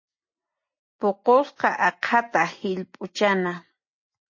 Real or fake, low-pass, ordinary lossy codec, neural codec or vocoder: real; 7.2 kHz; MP3, 32 kbps; none